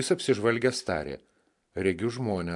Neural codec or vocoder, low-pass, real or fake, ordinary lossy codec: none; 10.8 kHz; real; AAC, 64 kbps